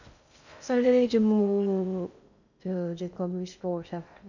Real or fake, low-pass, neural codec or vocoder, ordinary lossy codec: fake; 7.2 kHz; codec, 16 kHz in and 24 kHz out, 0.6 kbps, FocalCodec, streaming, 2048 codes; none